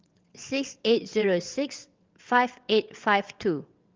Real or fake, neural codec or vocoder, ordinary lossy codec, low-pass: fake; vocoder, 22.05 kHz, 80 mel bands, WaveNeXt; Opus, 24 kbps; 7.2 kHz